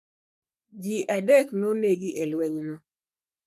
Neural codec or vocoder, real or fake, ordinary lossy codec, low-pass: codec, 44.1 kHz, 3.4 kbps, Pupu-Codec; fake; none; 14.4 kHz